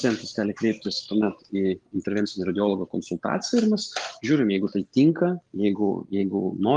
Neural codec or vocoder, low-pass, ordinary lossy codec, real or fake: none; 9.9 kHz; Opus, 32 kbps; real